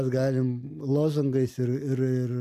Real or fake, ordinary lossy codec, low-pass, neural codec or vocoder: real; AAC, 96 kbps; 14.4 kHz; none